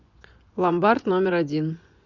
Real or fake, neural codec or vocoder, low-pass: fake; vocoder, 24 kHz, 100 mel bands, Vocos; 7.2 kHz